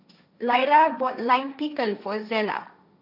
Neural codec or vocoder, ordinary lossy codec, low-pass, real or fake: codec, 16 kHz, 1.1 kbps, Voila-Tokenizer; none; 5.4 kHz; fake